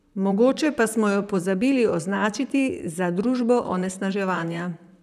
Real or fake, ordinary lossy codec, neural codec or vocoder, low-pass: fake; none; vocoder, 44.1 kHz, 128 mel bands, Pupu-Vocoder; 14.4 kHz